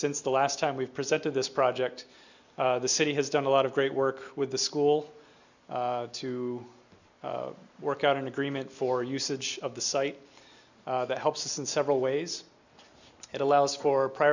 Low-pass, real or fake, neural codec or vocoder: 7.2 kHz; real; none